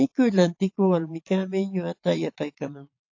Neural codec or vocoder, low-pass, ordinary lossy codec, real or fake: vocoder, 22.05 kHz, 80 mel bands, Vocos; 7.2 kHz; AAC, 48 kbps; fake